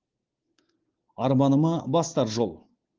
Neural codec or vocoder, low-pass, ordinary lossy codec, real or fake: none; 7.2 kHz; Opus, 16 kbps; real